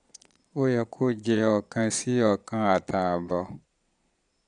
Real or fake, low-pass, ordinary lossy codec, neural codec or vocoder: fake; 9.9 kHz; none; vocoder, 22.05 kHz, 80 mel bands, Vocos